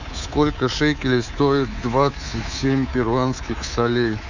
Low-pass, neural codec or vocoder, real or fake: 7.2 kHz; codec, 24 kHz, 3.1 kbps, DualCodec; fake